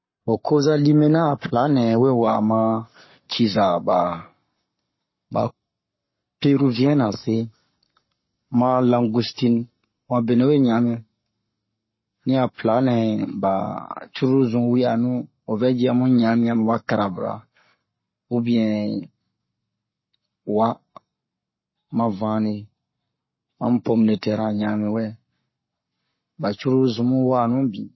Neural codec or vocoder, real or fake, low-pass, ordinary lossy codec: codec, 44.1 kHz, 7.8 kbps, Pupu-Codec; fake; 7.2 kHz; MP3, 24 kbps